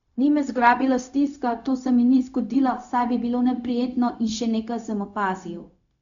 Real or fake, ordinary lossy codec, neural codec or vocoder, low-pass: fake; none; codec, 16 kHz, 0.4 kbps, LongCat-Audio-Codec; 7.2 kHz